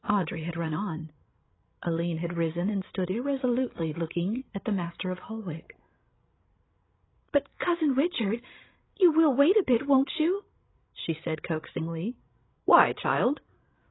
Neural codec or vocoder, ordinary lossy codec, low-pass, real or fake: codec, 16 kHz, 16 kbps, FreqCodec, larger model; AAC, 16 kbps; 7.2 kHz; fake